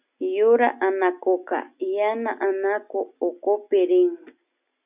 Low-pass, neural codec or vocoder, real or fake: 3.6 kHz; none; real